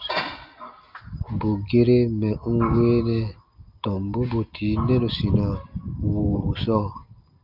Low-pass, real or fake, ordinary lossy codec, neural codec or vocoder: 5.4 kHz; real; Opus, 24 kbps; none